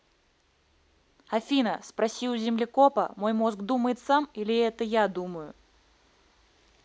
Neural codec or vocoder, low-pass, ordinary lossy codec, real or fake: none; none; none; real